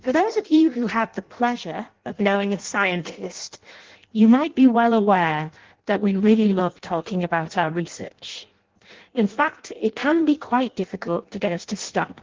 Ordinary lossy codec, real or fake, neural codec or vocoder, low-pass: Opus, 16 kbps; fake; codec, 16 kHz in and 24 kHz out, 0.6 kbps, FireRedTTS-2 codec; 7.2 kHz